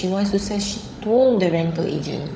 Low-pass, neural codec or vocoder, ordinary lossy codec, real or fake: none; codec, 16 kHz, 16 kbps, FunCodec, trained on LibriTTS, 50 frames a second; none; fake